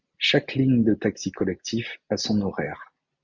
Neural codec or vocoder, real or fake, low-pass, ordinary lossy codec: none; real; 7.2 kHz; Opus, 64 kbps